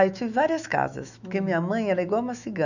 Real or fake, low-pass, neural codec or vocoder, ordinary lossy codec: fake; 7.2 kHz; autoencoder, 48 kHz, 128 numbers a frame, DAC-VAE, trained on Japanese speech; none